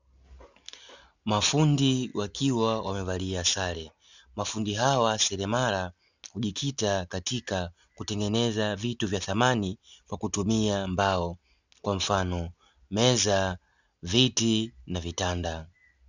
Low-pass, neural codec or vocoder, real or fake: 7.2 kHz; none; real